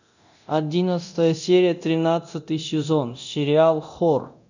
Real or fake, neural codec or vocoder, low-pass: fake; codec, 24 kHz, 0.9 kbps, DualCodec; 7.2 kHz